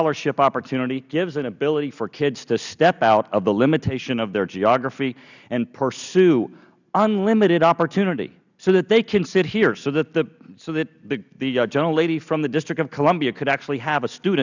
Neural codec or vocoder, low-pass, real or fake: none; 7.2 kHz; real